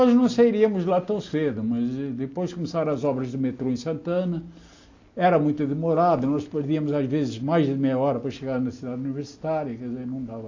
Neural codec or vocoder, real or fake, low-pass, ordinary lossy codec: none; real; 7.2 kHz; AAC, 48 kbps